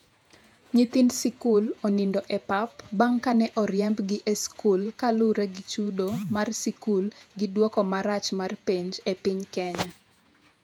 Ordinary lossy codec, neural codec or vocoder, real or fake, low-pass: none; vocoder, 48 kHz, 128 mel bands, Vocos; fake; 19.8 kHz